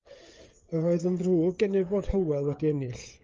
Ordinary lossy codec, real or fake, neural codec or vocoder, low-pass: Opus, 24 kbps; fake; codec, 16 kHz, 8 kbps, FreqCodec, smaller model; 7.2 kHz